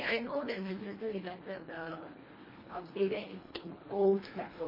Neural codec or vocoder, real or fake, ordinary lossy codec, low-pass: codec, 24 kHz, 1.5 kbps, HILCodec; fake; MP3, 24 kbps; 5.4 kHz